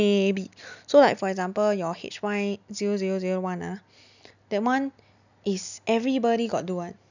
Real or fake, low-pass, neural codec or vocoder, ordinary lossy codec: real; 7.2 kHz; none; none